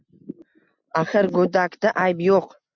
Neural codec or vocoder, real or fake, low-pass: none; real; 7.2 kHz